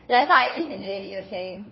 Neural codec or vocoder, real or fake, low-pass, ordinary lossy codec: codec, 16 kHz, 1 kbps, FunCodec, trained on LibriTTS, 50 frames a second; fake; 7.2 kHz; MP3, 24 kbps